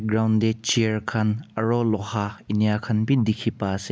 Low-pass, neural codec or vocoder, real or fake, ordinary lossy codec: none; none; real; none